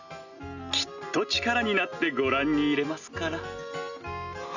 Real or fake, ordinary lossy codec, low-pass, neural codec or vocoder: real; none; 7.2 kHz; none